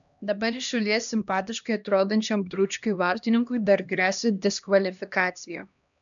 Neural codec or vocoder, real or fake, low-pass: codec, 16 kHz, 1 kbps, X-Codec, HuBERT features, trained on LibriSpeech; fake; 7.2 kHz